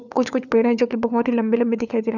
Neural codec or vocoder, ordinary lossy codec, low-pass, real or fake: codec, 16 kHz, 4 kbps, FunCodec, trained on LibriTTS, 50 frames a second; none; 7.2 kHz; fake